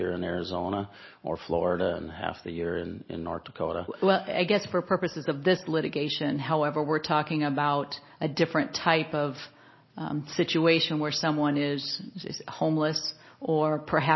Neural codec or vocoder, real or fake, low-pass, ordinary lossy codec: none; real; 7.2 kHz; MP3, 24 kbps